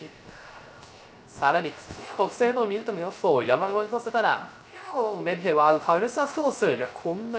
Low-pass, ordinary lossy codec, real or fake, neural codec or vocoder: none; none; fake; codec, 16 kHz, 0.3 kbps, FocalCodec